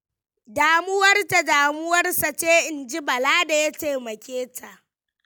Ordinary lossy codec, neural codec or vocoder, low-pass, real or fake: none; none; none; real